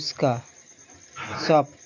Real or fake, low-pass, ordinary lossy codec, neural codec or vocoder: real; 7.2 kHz; MP3, 64 kbps; none